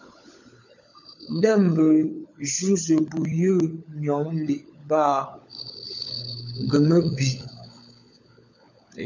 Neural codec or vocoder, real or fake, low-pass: codec, 16 kHz, 16 kbps, FunCodec, trained on LibriTTS, 50 frames a second; fake; 7.2 kHz